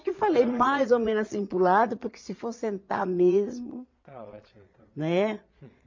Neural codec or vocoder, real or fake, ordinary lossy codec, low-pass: vocoder, 22.05 kHz, 80 mel bands, Vocos; fake; MP3, 48 kbps; 7.2 kHz